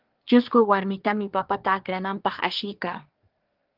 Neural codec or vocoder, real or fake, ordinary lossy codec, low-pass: codec, 24 kHz, 1 kbps, SNAC; fake; Opus, 32 kbps; 5.4 kHz